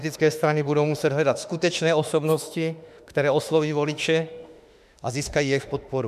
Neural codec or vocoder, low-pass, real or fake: autoencoder, 48 kHz, 32 numbers a frame, DAC-VAE, trained on Japanese speech; 14.4 kHz; fake